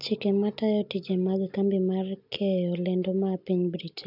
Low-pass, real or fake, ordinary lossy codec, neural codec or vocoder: 5.4 kHz; real; none; none